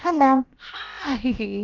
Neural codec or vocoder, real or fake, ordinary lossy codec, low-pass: codec, 16 kHz, about 1 kbps, DyCAST, with the encoder's durations; fake; Opus, 24 kbps; 7.2 kHz